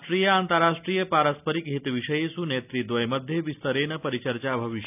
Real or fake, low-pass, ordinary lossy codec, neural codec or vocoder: real; 3.6 kHz; none; none